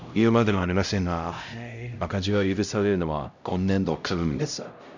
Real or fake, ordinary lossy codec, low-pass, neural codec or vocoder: fake; none; 7.2 kHz; codec, 16 kHz, 0.5 kbps, X-Codec, HuBERT features, trained on LibriSpeech